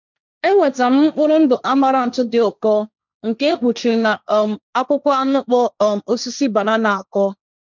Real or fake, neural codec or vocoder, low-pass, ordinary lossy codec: fake; codec, 16 kHz, 1.1 kbps, Voila-Tokenizer; 7.2 kHz; none